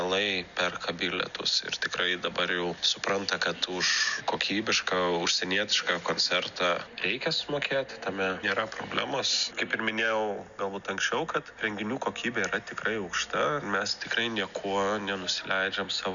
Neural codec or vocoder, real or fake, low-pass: none; real; 7.2 kHz